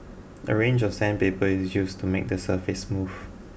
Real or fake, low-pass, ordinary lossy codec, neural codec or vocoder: real; none; none; none